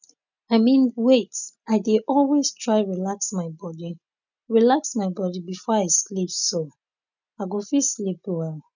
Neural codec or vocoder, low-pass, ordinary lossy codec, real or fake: none; 7.2 kHz; none; real